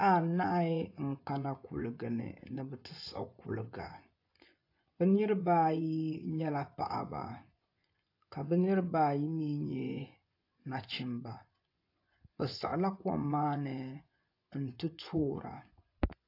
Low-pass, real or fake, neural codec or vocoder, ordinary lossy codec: 5.4 kHz; fake; vocoder, 24 kHz, 100 mel bands, Vocos; AAC, 32 kbps